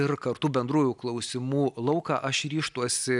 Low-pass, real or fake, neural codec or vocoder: 10.8 kHz; real; none